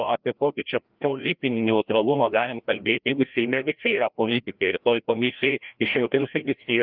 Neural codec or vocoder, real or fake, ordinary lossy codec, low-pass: codec, 16 kHz, 1 kbps, FreqCodec, larger model; fake; Opus, 24 kbps; 5.4 kHz